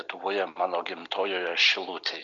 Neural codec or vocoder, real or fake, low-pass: none; real; 7.2 kHz